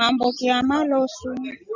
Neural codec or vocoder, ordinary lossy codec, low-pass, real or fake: none; Opus, 64 kbps; 7.2 kHz; real